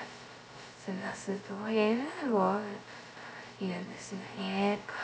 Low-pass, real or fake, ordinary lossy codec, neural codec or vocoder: none; fake; none; codec, 16 kHz, 0.2 kbps, FocalCodec